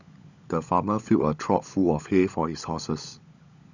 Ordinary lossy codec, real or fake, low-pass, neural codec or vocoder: none; fake; 7.2 kHz; codec, 16 kHz, 16 kbps, FunCodec, trained on LibriTTS, 50 frames a second